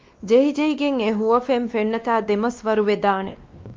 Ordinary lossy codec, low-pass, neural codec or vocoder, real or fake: Opus, 24 kbps; 7.2 kHz; codec, 16 kHz, 2 kbps, X-Codec, WavLM features, trained on Multilingual LibriSpeech; fake